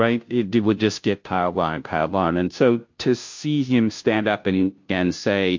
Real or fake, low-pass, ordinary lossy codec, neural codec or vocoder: fake; 7.2 kHz; MP3, 48 kbps; codec, 16 kHz, 0.5 kbps, FunCodec, trained on Chinese and English, 25 frames a second